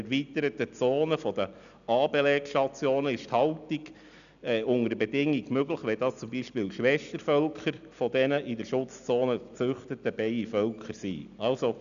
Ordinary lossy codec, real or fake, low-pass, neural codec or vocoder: none; real; 7.2 kHz; none